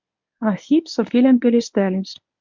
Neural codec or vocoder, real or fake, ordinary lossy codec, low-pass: codec, 24 kHz, 0.9 kbps, WavTokenizer, medium speech release version 1; fake; MP3, 64 kbps; 7.2 kHz